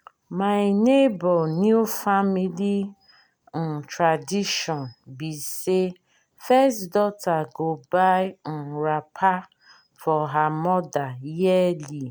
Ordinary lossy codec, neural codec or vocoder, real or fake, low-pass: none; none; real; none